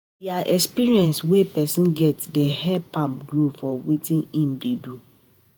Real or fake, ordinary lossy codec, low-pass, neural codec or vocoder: fake; none; none; vocoder, 48 kHz, 128 mel bands, Vocos